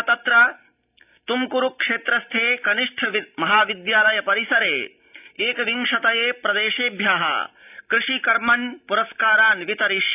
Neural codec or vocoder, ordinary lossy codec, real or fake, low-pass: none; none; real; 3.6 kHz